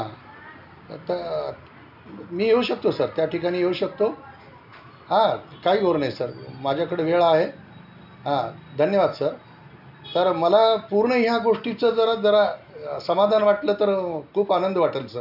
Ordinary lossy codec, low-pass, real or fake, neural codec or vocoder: none; 5.4 kHz; real; none